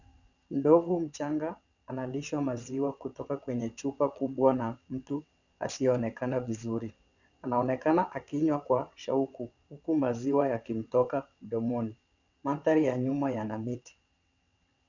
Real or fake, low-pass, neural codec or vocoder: fake; 7.2 kHz; vocoder, 22.05 kHz, 80 mel bands, WaveNeXt